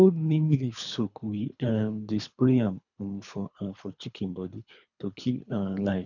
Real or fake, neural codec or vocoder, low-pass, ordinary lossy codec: fake; codec, 24 kHz, 3 kbps, HILCodec; 7.2 kHz; none